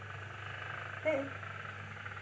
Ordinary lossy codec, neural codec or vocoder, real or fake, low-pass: none; none; real; none